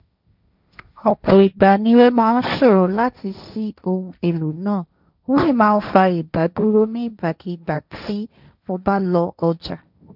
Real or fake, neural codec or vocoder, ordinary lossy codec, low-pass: fake; codec, 16 kHz, 1.1 kbps, Voila-Tokenizer; none; 5.4 kHz